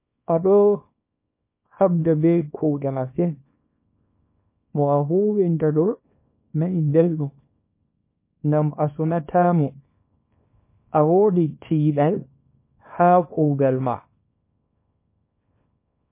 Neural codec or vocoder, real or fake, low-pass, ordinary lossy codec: codec, 24 kHz, 0.9 kbps, WavTokenizer, small release; fake; 3.6 kHz; MP3, 24 kbps